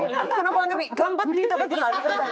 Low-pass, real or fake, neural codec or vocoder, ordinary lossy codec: none; fake; codec, 16 kHz, 4 kbps, X-Codec, HuBERT features, trained on general audio; none